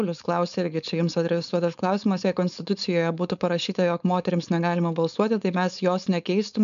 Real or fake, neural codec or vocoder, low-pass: fake; codec, 16 kHz, 4.8 kbps, FACodec; 7.2 kHz